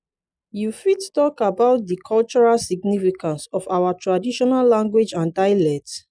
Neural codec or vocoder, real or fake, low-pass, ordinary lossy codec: none; real; 9.9 kHz; none